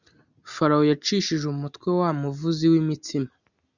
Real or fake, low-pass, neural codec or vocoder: real; 7.2 kHz; none